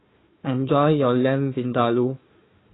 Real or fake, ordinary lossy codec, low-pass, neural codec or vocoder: fake; AAC, 16 kbps; 7.2 kHz; codec, 16 kHz, 1 kbps, FunCodec, trained on Chinese and English, 50 frames a second